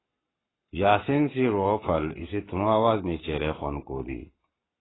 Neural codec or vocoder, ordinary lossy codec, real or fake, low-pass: codec, 44.1 kHz, 7.8 kbps, Pupu-Codec; AAC, 16 kbps; fake; 7.2 kHz